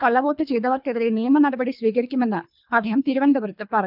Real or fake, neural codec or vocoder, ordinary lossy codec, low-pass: fake; codec, 24 kHz, 3 kbps, HILCodec; none; 5.4 kHz